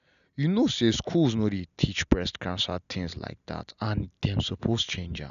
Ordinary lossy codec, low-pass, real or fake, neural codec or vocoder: none; 7.2 kHz; real; none